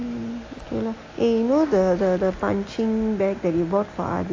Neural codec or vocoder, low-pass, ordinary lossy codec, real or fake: none; 7.2 kHz; AAC, 32 kbps; real